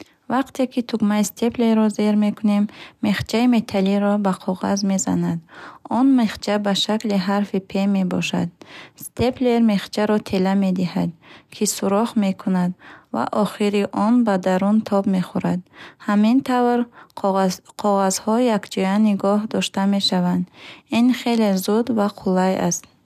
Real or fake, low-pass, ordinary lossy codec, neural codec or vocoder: real; 14.4 kHz; none; none